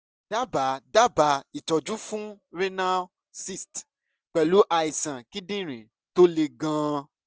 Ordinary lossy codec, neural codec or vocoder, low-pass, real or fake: none; none; none; real